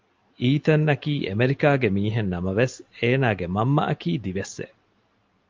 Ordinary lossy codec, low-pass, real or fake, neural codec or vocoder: Opus, 24 kbps; 7.2 kHz; real; none